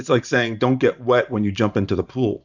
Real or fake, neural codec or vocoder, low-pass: fake; vocoder, 44.1 kHz, 128 mel bands every 512 samples, BigVGAN v2; 7.2 kHz